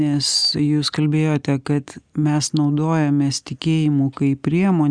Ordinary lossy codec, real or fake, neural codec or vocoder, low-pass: Opus, 64 kbps; real; none; 9.9 kHz